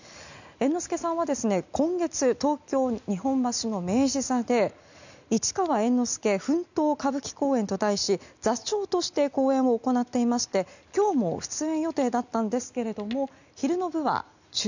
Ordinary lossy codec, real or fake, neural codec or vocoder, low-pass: none; real; none; 7.2 kHz